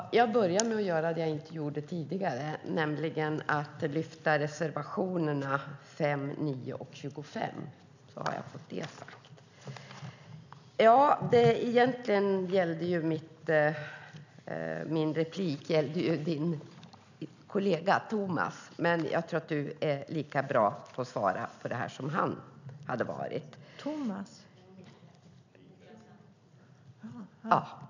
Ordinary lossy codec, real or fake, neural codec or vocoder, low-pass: none; real; none; 7.2 kHz